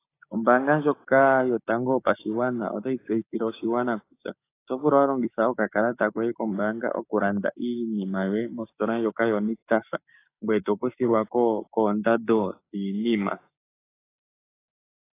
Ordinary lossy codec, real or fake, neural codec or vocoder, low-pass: AAC, 24 kbps; fake; codec, 16 kHz, 6 kbps, DAC; 3.6 kHz